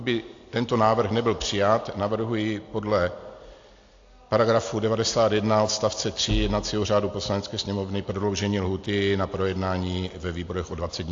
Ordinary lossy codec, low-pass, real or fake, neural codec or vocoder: AAC, 48 kbps; 7.2 kHz; real; none